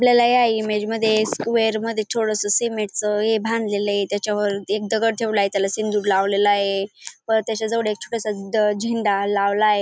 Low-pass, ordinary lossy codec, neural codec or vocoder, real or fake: none; none; none; real